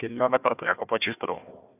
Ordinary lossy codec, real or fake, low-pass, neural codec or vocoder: none; fake; 3.6 kHz; codec, 16 kHz, 1 kbps, FunCodec, trained on Chinese and English, 50 frames a second